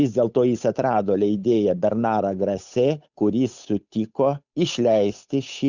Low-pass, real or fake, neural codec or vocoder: 7.2 kHz; fake; codec, 16 kHz, 8 kbps, FunCodec, trained on Chinese and English, 25 frames a second